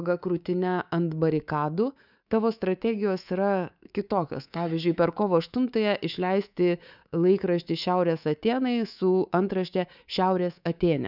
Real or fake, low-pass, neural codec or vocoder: fake; 5.4 kHz; codec, 24 kHz, 3.1 kbps, DualCodec